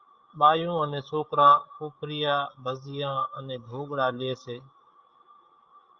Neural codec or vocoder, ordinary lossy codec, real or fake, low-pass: codec, 16 kHz, 16 kbps, FreqCodec, larger model; Opus, 32 kbps; fake; 7.2 kHz